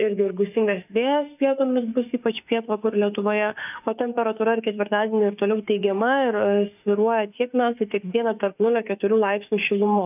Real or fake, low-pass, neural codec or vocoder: fake; 3.6 kHz; autoencoder, 48 kHz, 32 numbers a frame, DAC-VAE, trained on Japanese speech